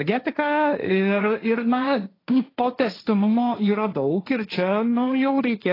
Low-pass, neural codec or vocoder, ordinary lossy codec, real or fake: 5.4 kHz; codec, 16 kHz, 1.1 kbps, Voila-Tokenizer; AAC, 24 kbps; fake